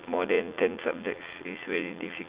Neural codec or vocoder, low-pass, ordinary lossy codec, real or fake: vocoder, 44.1 kHz, 80 mel bands, Vocos; 3.6 kHz; Opus, 64 kbps; fake